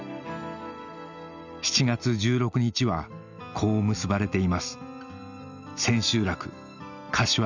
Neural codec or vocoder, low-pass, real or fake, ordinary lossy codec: none; 7.2 kHz; real; none